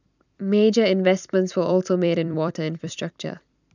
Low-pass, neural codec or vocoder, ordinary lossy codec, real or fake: 7.2 kHz; vocoder, 44.1 kHz, 128 mel bands every 256 samples, BigVGAN v2; none; fake